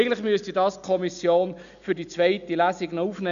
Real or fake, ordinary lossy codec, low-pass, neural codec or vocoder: real; none; 7.2 kHz; none